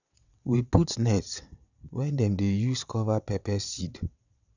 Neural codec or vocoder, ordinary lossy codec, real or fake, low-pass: vocoder, 22.05 kHz, 80 mel bands, WaveNeXt; none; fake; 7.2 kHz